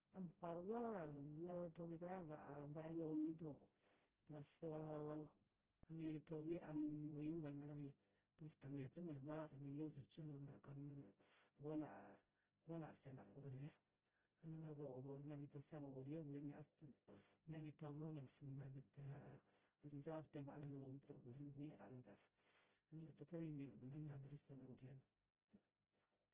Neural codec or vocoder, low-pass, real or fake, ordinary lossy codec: codec, 16 kHz, 0.5 kbps, FreqCodec, smaller model; 3.6 kHz; fake; Opus, 16 kbps